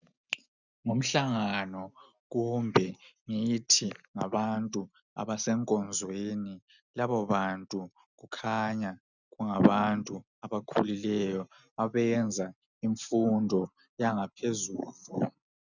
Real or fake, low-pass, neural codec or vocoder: real; 7.2 kHz; none